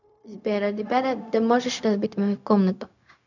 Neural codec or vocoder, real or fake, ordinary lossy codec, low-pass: codec, 16 kHz, 0.4 kbps, LongCat-Audio-Codec; fake; none; 7.2 kHz